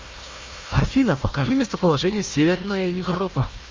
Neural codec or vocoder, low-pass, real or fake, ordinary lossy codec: codec, 16 kHz, 1 kbps, FunCodec, trained on Chinese and English, 50 frames a second; 7.2 kHz; fake; Opus, 32 kbps